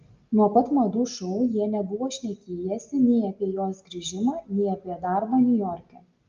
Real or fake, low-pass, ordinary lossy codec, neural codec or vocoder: real; 7.2 kHz; Opus, 32 kbps; none